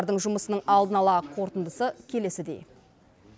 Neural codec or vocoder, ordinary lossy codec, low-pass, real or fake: none; none; none; real